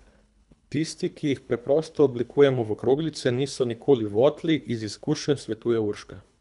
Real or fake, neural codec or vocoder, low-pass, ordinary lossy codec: fake; codec, 24 kHz, 3 kbps, HILCodec; 10.8 kHz; none